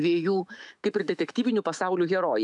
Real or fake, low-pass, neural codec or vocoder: fake; 10.8 kHz; autoencoder, 48 kHz, 128 numbers a frame, DAC-VAE, trained on Japanese speech